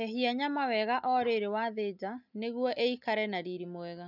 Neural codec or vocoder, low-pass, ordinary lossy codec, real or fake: none; 5.4 kHz; none; real